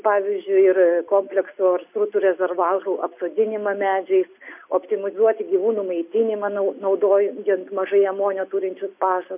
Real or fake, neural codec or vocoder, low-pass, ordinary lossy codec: real; none; 3.6 kHz; MP3, 32 kbps